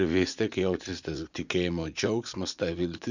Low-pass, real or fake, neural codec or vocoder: 7.2 kHz; fake; vocoder, 44.1 kHz, 80 mel bands, Vocos